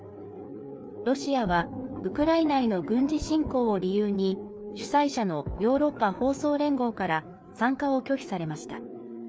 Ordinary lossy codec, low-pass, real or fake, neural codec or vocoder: none; none; fake; codec, 16 kHz, 4 kbps, FreqCodec, larger model